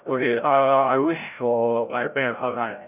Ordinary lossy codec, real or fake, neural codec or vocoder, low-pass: none; fake; codec, 16 kHz, 0.5 kbps, FreqCodec, larger model; 3.6 kHz